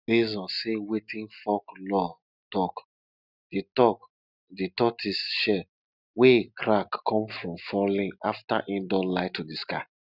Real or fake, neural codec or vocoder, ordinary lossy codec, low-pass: real; none; none; 5.4 kHz